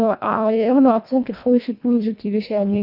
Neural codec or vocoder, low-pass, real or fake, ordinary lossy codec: codec, 24 kHz, 1.5 kbps, HILCodec; 5.4 kHz; fake; none